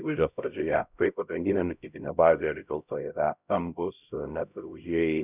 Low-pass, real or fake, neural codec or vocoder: 3.6 kHz; fake; codec, 16 kHz, 0.5 kbps, X-Codec, HuBERT features, trained on LibriSpeech